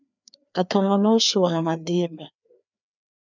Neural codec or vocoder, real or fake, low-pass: codec, 16 kHz, 2 kbps, FreqCodec, larger model; fake; 7.2 kHz